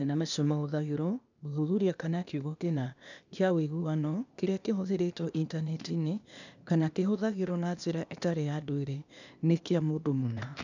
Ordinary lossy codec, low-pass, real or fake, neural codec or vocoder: none; 7.2 kHz; fake; codec, 16 kHz, 0.8 kbps, ZipCodec